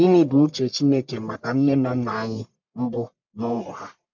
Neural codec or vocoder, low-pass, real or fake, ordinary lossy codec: codec, 44.1 kHz, 1.7 kbps, Pupu-Codec; 7.2 kHz; fake; MP3, 64 kbps